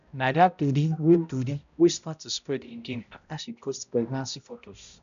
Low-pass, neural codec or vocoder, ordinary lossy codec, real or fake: 7.2 kHz; codec, 16 kHz, 0.5 kbps, X-Codec, HuBERT features, trained on balanced general audio; none; fake